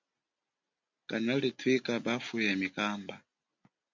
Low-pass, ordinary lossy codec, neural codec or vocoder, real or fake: 7.2 kHz; MP3, 64 kbps; none; real